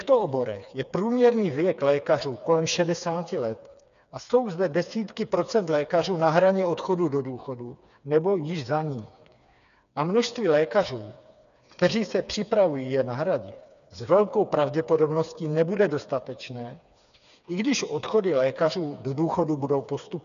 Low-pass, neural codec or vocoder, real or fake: 7.2 kHz; codec, 16 kHz, 4 kbps, FreqCodec, smaller model; fake